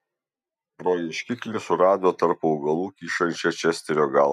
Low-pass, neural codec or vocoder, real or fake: 14.4 kHz; none; real